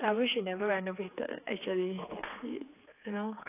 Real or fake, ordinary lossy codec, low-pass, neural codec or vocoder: fake; AAC, 24 kbps; 3.6 kHz; codec, 16 kHz, 4 kbps, X-Codec, HuBERT features, trained on general audio